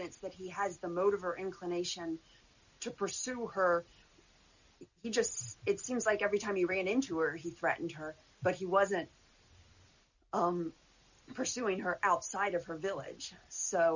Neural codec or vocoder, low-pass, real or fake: none; 7.2 kHz; real